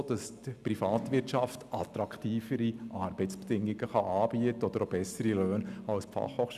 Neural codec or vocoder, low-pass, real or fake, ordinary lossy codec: none; 14.4 kHz; real; none